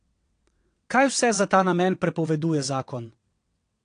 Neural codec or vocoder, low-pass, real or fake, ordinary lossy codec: vocoder, 22.05 kHz, 80 mel bands, WaveNeXt; 9.9 kHz; fake; AAC, 48 kbps